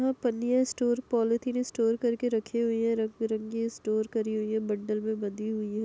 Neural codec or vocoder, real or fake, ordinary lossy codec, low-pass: none; real; none; none